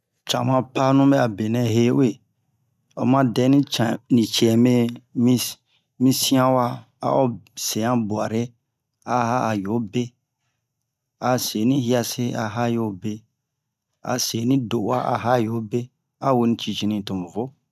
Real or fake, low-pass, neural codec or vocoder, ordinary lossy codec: real; 14.4 kHz; none; none